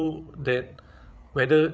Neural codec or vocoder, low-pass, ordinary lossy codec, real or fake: codec, 16 kHz, 8 kbps, FreqCodec, larger model; none; none; fake